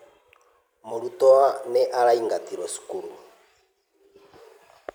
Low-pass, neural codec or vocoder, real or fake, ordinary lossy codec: none; vocoder, 44.1 kHz, 128 mel bands every 256 samples, BigVGAN v2; fake; none